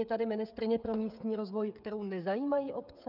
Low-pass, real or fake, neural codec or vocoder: 5.4 kHz; fake; codec, 16 kHz, 16 kbps, FreqCodec, smaller model